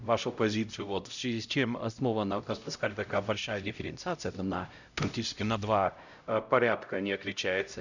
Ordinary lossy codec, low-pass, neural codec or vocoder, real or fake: none; 7.2 kHz; codec, 16 kHz, 0.5 kbps, X-Codec, HuBERT features, trained on LibriSpeech; fake